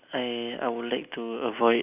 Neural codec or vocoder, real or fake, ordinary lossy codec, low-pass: none; real; none; 3.6 kHz